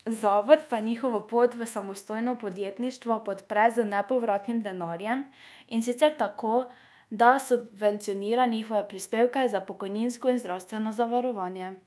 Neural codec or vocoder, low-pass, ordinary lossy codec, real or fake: codec, 24 kHz, 1.2 kbps, DualCodec; none; none; fake